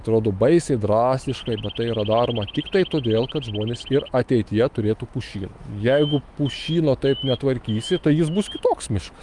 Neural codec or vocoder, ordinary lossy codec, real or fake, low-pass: autoencoder, 48 kHz, 128 numbers a frame, DAC-VAE, trained on Japanese speech; Opus, 32 kbps; fake; 10.8 kHz